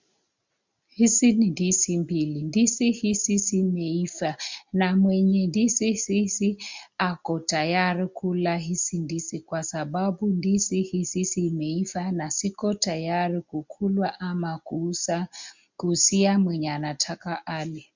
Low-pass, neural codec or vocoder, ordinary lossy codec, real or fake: 7.2 kHz; none; MP3, 64 kbps; real